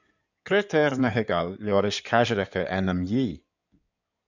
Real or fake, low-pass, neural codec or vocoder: fake; 7.2 kHz; codec, 16 kHz in and 24 kHz out, 2.2 kbps, FireRedTTS-2 codec